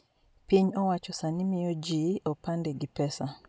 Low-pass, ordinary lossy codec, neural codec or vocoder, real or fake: none; none; none; real